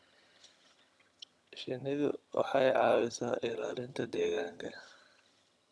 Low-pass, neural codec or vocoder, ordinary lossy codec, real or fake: none; vocoder, 22.05 kHz, 80 mel bands, HiFi-GAN; none; fake